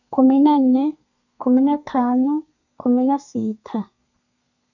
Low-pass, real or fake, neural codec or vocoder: 7.2 kHz; fake; codec, 32 kHz, 1.9 kbps, SNAC